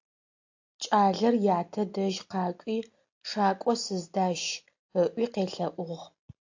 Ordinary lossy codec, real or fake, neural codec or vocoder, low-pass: AAC, 48 kbps; real; none; 7.2 kHz